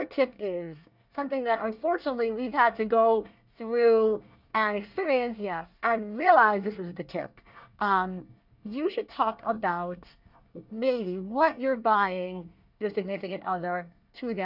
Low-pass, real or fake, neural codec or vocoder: 5.4 kHz; fake; codec, 24 kHz, 1 kbps, SNAC